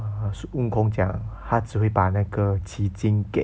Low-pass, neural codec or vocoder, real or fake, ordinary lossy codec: none; none; real; none